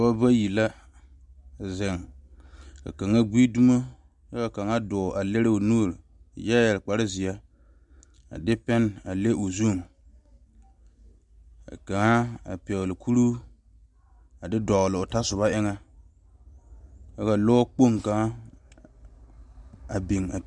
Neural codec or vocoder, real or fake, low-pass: none; real; 10.8 kHz